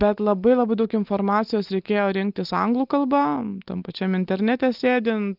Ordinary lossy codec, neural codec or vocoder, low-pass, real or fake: Opus, 32 kbps; none; 5.4 kHz; real